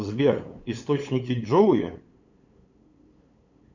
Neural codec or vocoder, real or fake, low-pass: codec, 16 kHz, 8 kbps, FunCodec, trained on LibriTTS, 25 frames a second; fake; 7.2 kHz